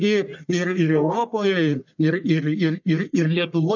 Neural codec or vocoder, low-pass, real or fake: codec, 44.1 kHz, 1.7 kbps, Pupu-Codec; 7.2 kHz; fake